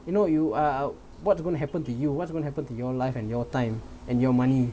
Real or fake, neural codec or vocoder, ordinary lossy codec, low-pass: real; none; none; none